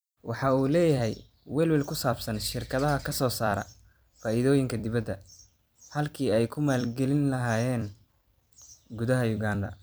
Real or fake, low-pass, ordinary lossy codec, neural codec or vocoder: fake; none; none; vocoder, 44.1 kHz, 128 mel bands every 256 samples, BigVGAN v2